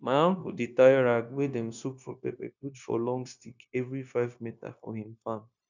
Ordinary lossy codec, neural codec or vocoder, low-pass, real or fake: none; codec, 16 kHz, 0.9 kbps, LongCat-Audio-Codec; 7.2 kHz; fake